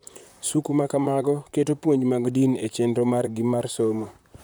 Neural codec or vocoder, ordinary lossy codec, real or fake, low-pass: vocoder, 44.1 kHz, 128 mel bands, Pupu-Vocoder; none; fake; none